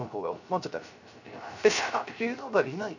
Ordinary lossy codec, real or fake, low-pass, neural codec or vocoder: none; fake; 7.2 kHz; codec, 16 kHz, 0.3 kbps, FocalCodec